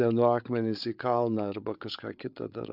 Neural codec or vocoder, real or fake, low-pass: codec, 16 kHz, 8 kbps, FreqCodec, larger model; fake; 5.4 kHz